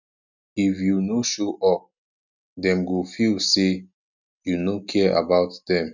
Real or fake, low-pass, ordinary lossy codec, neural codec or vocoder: real; 7.2 kHz; none; none